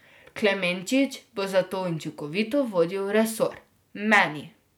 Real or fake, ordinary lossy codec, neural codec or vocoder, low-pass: real; none; none; none